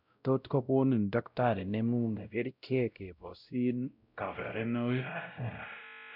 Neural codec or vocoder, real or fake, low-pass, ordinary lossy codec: codec, 16 kHz, 0.5 kbps, X-Codec, WavLM features, trained on Multilingual LibriSpeech; fake; 5.4 kHz; none